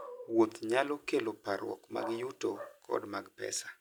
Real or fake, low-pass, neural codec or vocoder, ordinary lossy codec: real; none; none; none